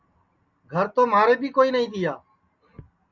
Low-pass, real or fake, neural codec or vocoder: 7.2 kHz; real; none